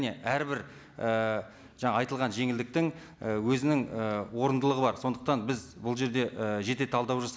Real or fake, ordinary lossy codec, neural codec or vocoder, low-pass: real; none; none; none